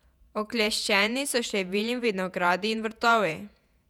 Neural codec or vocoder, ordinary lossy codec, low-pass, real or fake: vocoder, 48 kHz, 128 mel bands, Vocos; none; 19.8 kHz; fake